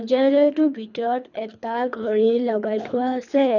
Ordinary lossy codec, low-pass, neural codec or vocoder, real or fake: none; 7.2 kHz; codec, 24 kHz, 3 kbps, HILCodec; fake